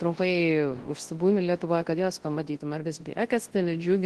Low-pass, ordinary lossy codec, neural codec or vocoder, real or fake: 10.8 kHz; Opus, 16 kbps; codec, 24 kHz, 0.9 kbps, WavTokenizer, large speech release; fake